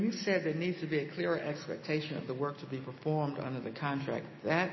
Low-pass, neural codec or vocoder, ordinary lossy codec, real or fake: 7.2 kHz; none; MP3, 24 kbps; real